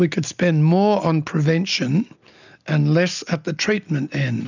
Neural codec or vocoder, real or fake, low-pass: none; real; 7.2 kHz